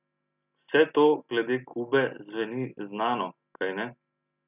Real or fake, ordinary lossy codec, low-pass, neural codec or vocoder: real; none; 3.6 kHz; none